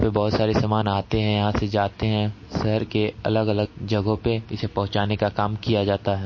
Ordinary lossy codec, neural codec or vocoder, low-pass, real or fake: MP3, 32 kbps; none; 7.2 kHz; real